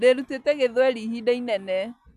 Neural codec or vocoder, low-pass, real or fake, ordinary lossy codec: none; 14.4 kHz; real; none